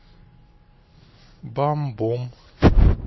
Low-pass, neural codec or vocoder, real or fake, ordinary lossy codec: 7.2 kHz; none; real; MP3, 24 kbps